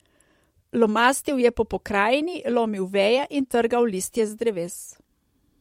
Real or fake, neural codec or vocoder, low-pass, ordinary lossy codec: fake; vocoder, 44.1 kHz, 128 mel bands every 256 samples, BigVGAN v2; 19.8 kHz; MP3, 64 kbps